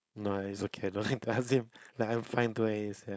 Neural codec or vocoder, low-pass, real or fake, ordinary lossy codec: codec, 16 kHz, 4.8 kbps, FACodec; none; fake; none